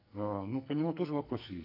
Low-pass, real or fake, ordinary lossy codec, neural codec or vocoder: 5.4 kHz; fake; AAC, 48 kbps; codec, 44.1 kHz, 2.6 kbps, SNAC